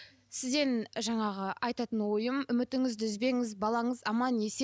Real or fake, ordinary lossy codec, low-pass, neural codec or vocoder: real; none; none; none